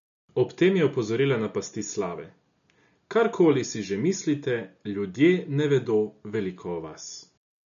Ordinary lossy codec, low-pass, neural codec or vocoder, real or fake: none; 7.2 kHz; none; real